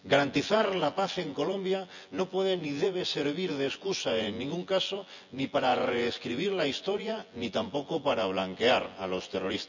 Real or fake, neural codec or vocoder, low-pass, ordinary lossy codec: fake; vocoder, 24 kHz, 100 mel bands, Vocos; 7.2 kHz; none